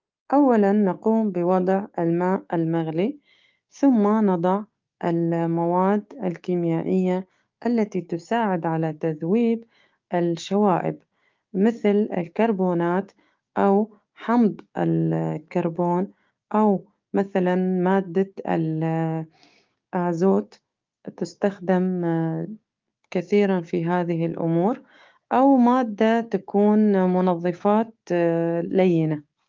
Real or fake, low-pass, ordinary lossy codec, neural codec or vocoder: fake; 7.2 kHz; Opus, 32 kbps; codec, 16 kHz, 6 kbps, DAC